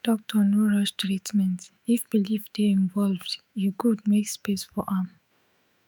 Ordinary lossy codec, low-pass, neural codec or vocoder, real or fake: none; none; autoencoder, 48 kHz, 128 numbers a frame, DAC-VAE, trained on Japanese speech; fake